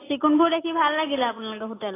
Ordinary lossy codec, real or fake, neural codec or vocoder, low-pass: AAC, 16 kbps; real; none; 3.6 kHz